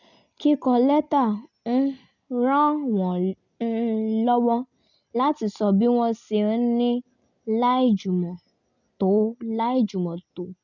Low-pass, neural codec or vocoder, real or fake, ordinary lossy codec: 7.2 kHz; none; real; none